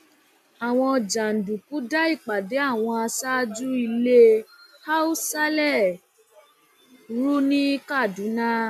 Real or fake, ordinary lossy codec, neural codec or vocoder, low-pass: real; none; none; 14.4 kHz